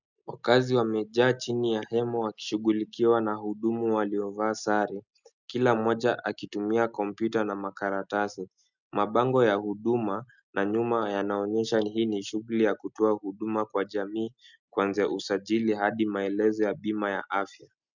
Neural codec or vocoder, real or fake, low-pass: none; real; 7.2 kHz